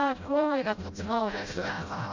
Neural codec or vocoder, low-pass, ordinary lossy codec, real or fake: codec, 16 kHz, 0.5 kbps, FreqCodec, smaller model; 7.2 kHz; MP3, 64 kbps; fake